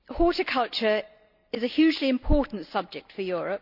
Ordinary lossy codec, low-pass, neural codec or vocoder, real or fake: none; 5.4 kHz; none; real